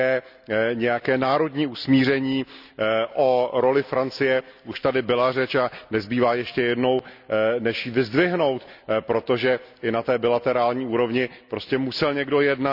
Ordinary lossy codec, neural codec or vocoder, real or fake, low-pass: none; none; real; 5.4 kHz